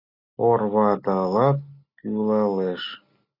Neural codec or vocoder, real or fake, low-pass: none; real; 5.4 kHz